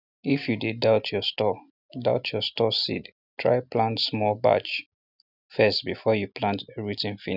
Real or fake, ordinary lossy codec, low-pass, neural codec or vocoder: real; none; 5.4 kHz; none